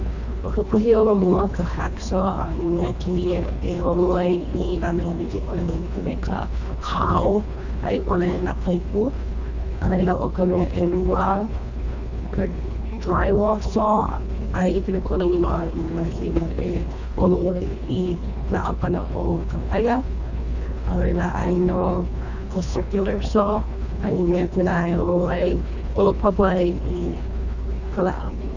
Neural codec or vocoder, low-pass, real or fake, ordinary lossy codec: codec, 24 kHz, 1.5 kbps, HILCodec; 7.2 kHz; fake; none